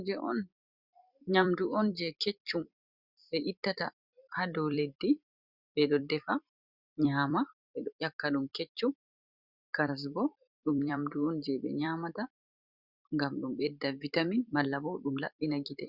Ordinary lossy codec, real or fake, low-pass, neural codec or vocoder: Opus, 64 kbps; fake; 5.4 kHz; vocoder, 44.1 kHz, 128 mel bands every 256 samples, BigVGAN v2